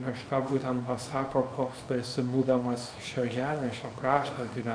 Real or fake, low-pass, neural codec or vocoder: fake; 9.9 kHz; codec, 24 kHz, 0.9 kbps, WavTokenizer, small release